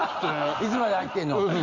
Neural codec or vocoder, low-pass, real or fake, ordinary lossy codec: none; 7.2 kHz; real; none